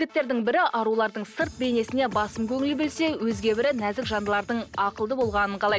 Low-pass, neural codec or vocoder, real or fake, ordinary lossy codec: none; none; real; none